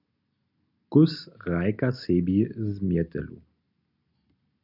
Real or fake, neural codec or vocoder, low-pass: real; none; 5.4 kHz